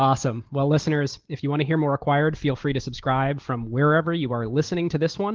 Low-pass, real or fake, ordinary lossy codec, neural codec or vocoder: 7.2 kHz; real; Opus, 16 kbps; none